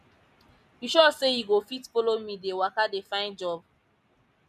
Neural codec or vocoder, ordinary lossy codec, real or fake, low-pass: none; none; real; 14.4 kHz